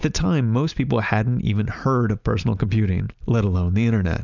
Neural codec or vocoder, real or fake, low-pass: none; real; 7.2 kHz